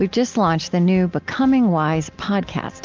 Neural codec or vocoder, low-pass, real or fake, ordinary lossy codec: none; 7.2 kHz; real; Opus, 32 kbps